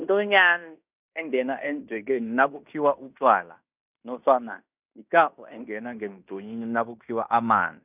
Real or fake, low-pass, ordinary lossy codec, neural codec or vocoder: fake; 3.6 kHz; none; codec, 16 kHz in and 24 kHz out, 0.9 kbps, LongCat-Audio-Codec, fine tuned four codebook decoder